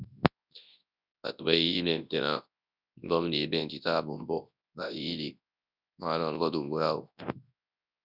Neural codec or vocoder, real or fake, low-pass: codec, 24 kHz, 0.9 kbps, WavTokenizer, large speech release; fake; 5.4 kHz